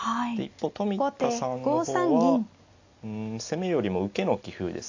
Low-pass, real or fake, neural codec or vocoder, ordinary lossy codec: 7.2 kHz; real; none; AAC, 48 kbps